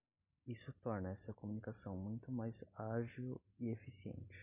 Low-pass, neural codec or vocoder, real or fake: 3.6 kHz; none; real